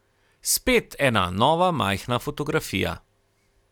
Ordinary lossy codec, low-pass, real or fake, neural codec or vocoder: none; 19.8 kHz; real; none